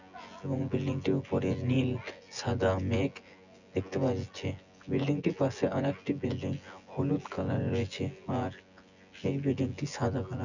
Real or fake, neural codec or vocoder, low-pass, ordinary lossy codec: fake; vocoder, 24 kHz, 100 mel bands, Vocos; 7.2 kHz; none